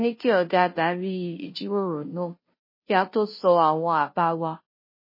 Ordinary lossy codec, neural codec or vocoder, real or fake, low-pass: MP3, 24 kbps; codec, 16 kHz, 0.5 kbps, FunCodec, trained on Chinese and English, 25 frames a second; fake; 5.4 kHz